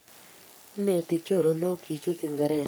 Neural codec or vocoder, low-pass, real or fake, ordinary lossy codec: codec, 44.1 kHz, 3.4 kbps, Pupu-Codec; none; fake; none